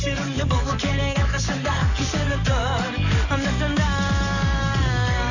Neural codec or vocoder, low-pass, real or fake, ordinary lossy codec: codec, 44.1 kHz, 7.8 kbps, DAC; 7.2 kHz; fake; none